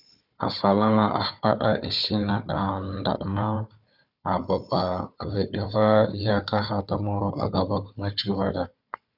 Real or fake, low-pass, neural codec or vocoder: fake; 5.4 kHz; codec, 16 kHz, 4 kbps, FunCodec, trained on Chinese and English, 50 frames a second